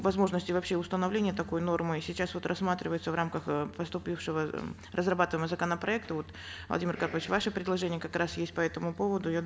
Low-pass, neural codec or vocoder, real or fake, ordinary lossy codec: none; none; real; none